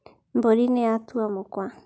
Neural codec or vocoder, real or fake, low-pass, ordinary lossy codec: none; real; none; none